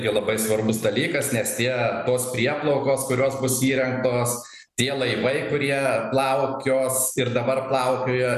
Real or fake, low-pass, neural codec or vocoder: real; 14.4 kHz; none